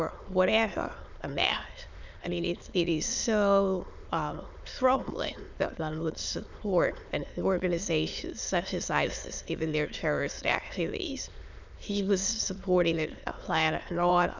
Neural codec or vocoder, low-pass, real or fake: autoencoder, 22.05 kHz, a latent of 192 numbers a frame, VITS, trained on many speakers; 7.2 kHz; fake